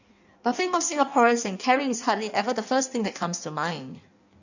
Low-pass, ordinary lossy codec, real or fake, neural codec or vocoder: 7.2 kHz; none; fake; codec, 16 kHz in and 24 kHz out, 1.1 kbps, FireRedTTS-2 codec